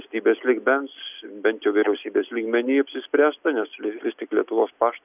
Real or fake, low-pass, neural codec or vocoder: real; 3.6 kHz; none